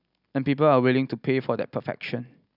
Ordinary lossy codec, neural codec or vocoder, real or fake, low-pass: none; none; real; 5.4 kHz